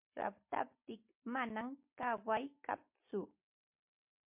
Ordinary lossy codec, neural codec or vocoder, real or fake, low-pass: MP3, 32 kbps; none; real; 3.6 kHz